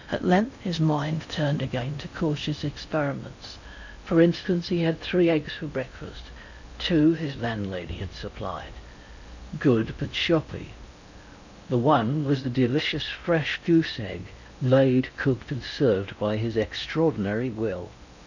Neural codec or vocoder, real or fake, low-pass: codec, 16 kHz in and 24 kHz out, 0.6 kbps, FocalCodec, streaming, 4096 codes; fake; 7.2 kHz